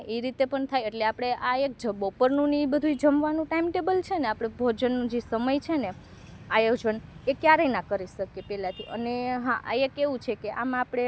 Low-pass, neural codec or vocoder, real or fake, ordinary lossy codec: none; none; real; none